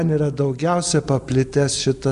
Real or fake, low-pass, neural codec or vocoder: real; 10.8 kHz; none